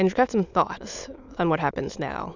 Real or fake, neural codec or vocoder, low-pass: fake; autoencoder, 22.05 kHz, a latent of 192 numbers a frame, VITS, trained on many speakers; 7.2 kHz